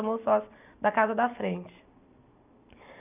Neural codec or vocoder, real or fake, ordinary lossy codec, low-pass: none; real; none; 3.6 kHz